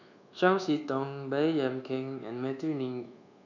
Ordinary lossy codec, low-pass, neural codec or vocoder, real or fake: none; 7.2 kHz; codec, 24 kHz, 1.2 kbps, DualCodec; fake